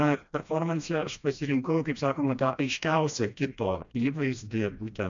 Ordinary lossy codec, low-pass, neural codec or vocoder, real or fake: AAC, 64 kbps; 7.2 kHz; codec, 16 kHz, 1 kbps, FreqCodec, smaller model; fake